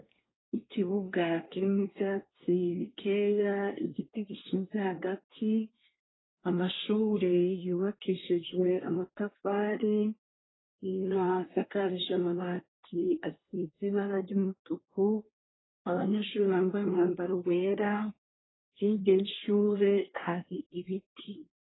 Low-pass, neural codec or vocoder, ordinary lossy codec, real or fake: 7.2 kHz; codec, 24 kHz, 1 kbps, SNAC; AAC, 16 kbps; fake